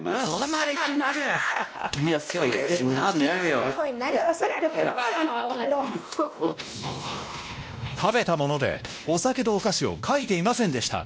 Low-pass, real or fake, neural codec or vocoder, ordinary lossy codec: none; fake; codec, 16 kHz, 1 kbps, X-Codec, WavLM features, trained on Multilingual LibriSpeech; none